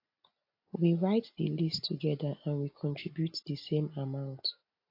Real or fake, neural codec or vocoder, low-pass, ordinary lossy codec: real; none; 5.4 kHz; AAC, 32 kbps